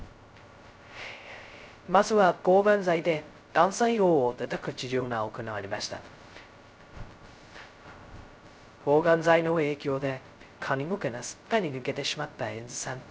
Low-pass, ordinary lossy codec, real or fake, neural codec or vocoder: none; none; fake; codec, 16 kHz, 0.2 kbps, FocalCodec